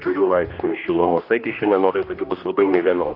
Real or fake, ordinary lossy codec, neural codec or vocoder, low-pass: fake; AAC, 32 kbps; codec, 16 kHz, 1 kbps, X-Codec, HuBERT features, trained on general audio; 5.4 kHz